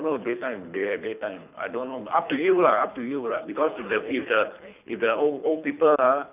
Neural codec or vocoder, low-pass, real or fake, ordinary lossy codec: codec, 24 kHz, 3 kbps, HILCodec; 3.6 kHz; fake; none